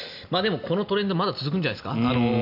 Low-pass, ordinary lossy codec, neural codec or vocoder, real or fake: 5.4 kHz; none; none; real